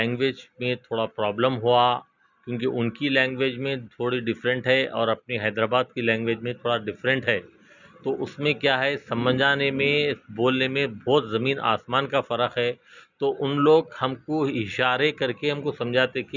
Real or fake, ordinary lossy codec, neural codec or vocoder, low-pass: real; none; none; 7.2 kHz